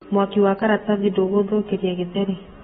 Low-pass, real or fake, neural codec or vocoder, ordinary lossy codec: 19.8 kHz; real; none; AAC, 16 kbps